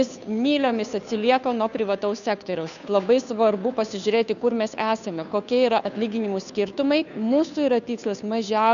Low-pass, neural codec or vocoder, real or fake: 7.2 kHz; codec, 16 kHz, 2 kbps, FunCodec, trained on Chinese and English, 25 frames a second; fake